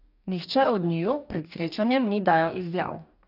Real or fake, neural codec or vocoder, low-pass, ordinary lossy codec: fake; codec, 44.1 kHz, 2.6 kbps, DAC; 5.4 kHz; none